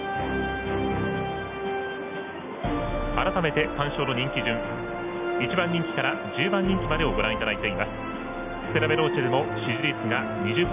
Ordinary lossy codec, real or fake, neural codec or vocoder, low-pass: none; real; none; 3.6 kHz